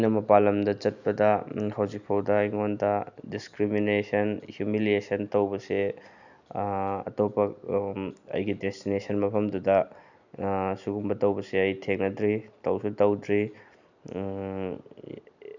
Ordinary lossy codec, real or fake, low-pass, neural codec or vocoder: none; real; 7.2 kHz; none